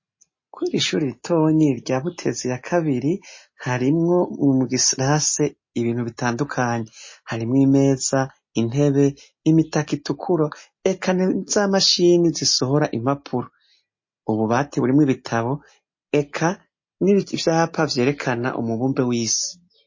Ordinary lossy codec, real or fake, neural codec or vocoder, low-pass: MP3, 32 kbps; real; none; 7.2 kHz